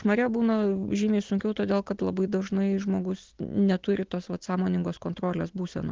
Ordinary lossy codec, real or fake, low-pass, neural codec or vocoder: Opus, 16 kbps; real; 7.2 kHz; none